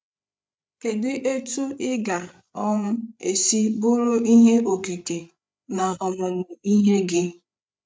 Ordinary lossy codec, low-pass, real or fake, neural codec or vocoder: none; none; fake; codec, 16 kHz, 8 kbps, FreqCodec, larger model